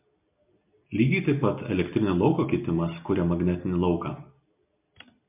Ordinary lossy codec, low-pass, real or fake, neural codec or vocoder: MP3, 32 kbps; 3.6 kHz; real; none